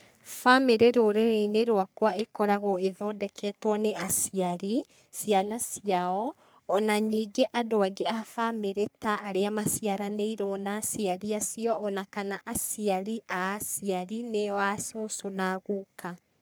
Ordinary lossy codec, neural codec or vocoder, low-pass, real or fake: none; codec, 44.1 kHz, 3.4 kbps, Pupu-Codec; none; fake